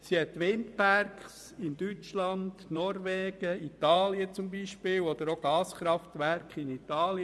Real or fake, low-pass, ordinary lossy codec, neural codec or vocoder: fake; none; none; vocoder, 24 kHz, 100 mel bands, Vocos